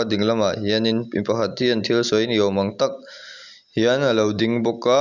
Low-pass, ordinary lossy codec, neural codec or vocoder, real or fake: 7.2 kHz; none; none; real